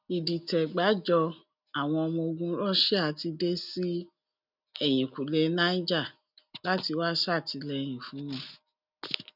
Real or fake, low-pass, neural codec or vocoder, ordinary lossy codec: real; 5.4 kHz; none; none